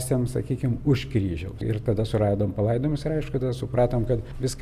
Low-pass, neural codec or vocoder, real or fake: 14.4 kHz; none; real